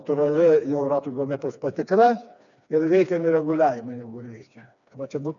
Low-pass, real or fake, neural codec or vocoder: 7.2 kHz; fake; codec, 16 kHz, 2 kbps, FreqCodec, smaller model